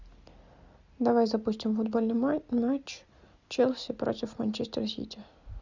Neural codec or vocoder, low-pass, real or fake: none; 7.2 kHz; real